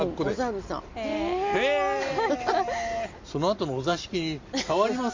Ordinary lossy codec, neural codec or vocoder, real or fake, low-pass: MP3, 48 kbps; none; real; 7.2 kHz